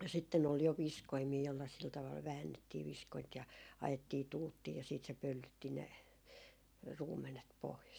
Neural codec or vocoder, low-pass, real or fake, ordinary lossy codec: none; none; real; none